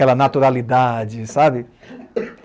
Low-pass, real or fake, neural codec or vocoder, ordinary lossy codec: none; real; none; none